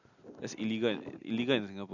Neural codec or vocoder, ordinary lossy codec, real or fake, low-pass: none; none; real; 7.2 kHz